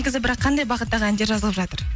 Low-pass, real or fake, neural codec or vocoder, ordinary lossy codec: none; real; none; none